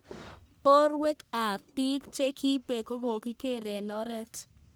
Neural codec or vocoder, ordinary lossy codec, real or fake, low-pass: codec, 44.1 kHz, 1.7 kbps, Pupu-Codec; none; fake; none